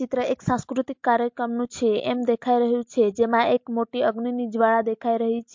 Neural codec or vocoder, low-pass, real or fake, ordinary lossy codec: none; 7.2 kHz; real; MP3, 48 kbps